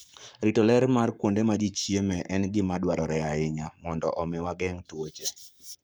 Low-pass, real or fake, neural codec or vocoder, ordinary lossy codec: none; fake; codec, 44.1 kHz, 7.8 kbps, Pupu-Codec; none